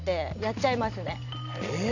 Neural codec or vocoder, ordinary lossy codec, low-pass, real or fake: none; none; 7.2 kHz; real